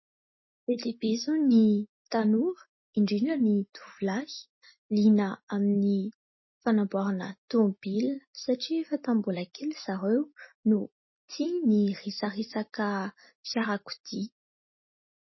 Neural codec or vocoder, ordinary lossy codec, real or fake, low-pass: none; MP3, 24 kbps; real; 7.2 kHz